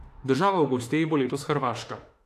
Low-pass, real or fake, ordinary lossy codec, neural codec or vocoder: 14.4 kHz; fake; AAC, 64 kbps; autoencoder, 48 kHz, 32 numbers a frame, DAC-VAE, trained on Japanese speech